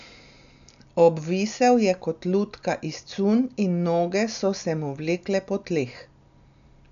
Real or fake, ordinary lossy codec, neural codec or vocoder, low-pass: real; none; none; 7.2 kHz